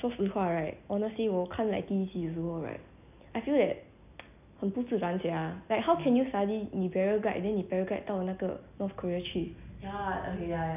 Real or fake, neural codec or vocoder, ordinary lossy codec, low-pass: real; none; none; 3.6 kHz